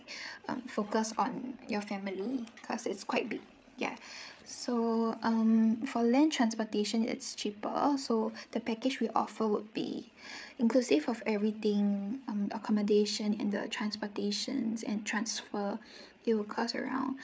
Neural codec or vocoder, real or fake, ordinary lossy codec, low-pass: codec, 16 kHz, 8 kbps, FreqCodec, larger model; fake; none; none